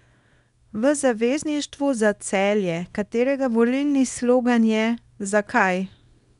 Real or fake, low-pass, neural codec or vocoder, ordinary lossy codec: fake; 10.8 kHz; codec, 24 kHz, 0.9 kbps, WavTokenizer, small release; none